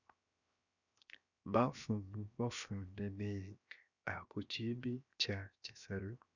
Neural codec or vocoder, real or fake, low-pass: codec, 16 kHz, 0.7 kbps, FocalCodec; fake; 7.2 kHz